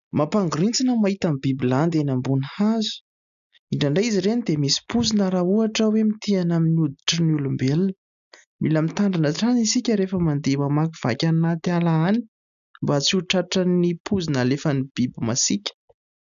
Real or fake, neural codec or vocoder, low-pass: real; none; 7.2 kHz